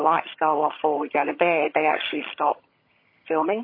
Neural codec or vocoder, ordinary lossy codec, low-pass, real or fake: vocoder, 22.05 kHz, 80 mel bands, HiFi-GAN; MP3, 24 kbps; 5.4 kHz; fake